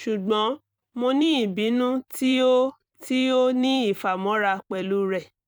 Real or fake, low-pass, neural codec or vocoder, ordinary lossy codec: fake; 19.8 kHz; vocoder, 44.1 kHz, 128 mel bands every 256 samples, BigVGAN v2; none